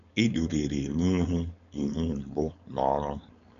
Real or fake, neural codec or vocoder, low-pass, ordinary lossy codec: fake; codec, 16 kHz, 4.8 kbps, FACodec; 7.2 kHz; none